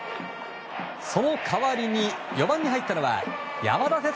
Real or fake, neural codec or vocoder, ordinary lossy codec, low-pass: real; none; none; none